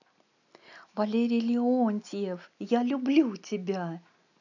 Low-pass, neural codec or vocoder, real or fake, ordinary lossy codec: 7.2 kHz; none; real; none